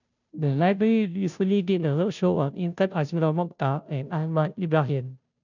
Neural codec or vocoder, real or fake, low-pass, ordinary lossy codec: codec, 16 kHz, 0.5 kbps, FunCodec, trained on Chinese and English, 25 frames a second; fake; 7.2 kHz; none